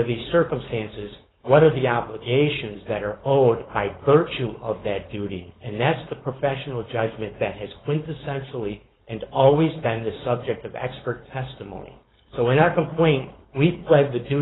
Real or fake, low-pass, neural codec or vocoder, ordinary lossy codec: fake; 7.2 kHz; codec, 16 kHz, 4.8 kbps, FACodec; AAC, 16 kbps